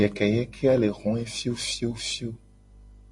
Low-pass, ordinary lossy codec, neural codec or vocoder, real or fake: 10.8 kHz; MP3, 48 kbps; none; real